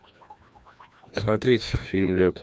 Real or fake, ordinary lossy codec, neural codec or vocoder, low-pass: fake; none; codec, 16 kHz, 1 kbps, FreqCodec, larger model; none